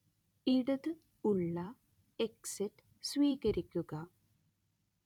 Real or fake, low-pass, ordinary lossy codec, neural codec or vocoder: fake; 19.8 kHz; none; vocoder, 44.1 kHz, 128 mel bands every 256 samples, BigVGAN v2